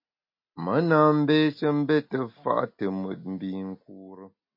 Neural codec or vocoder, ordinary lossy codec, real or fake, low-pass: none; MP3, 32 kbps; real; 5.4 kHz